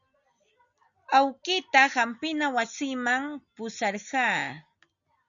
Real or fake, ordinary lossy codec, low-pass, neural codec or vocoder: real; MP3, 96 kbps; 7.2 kHz; none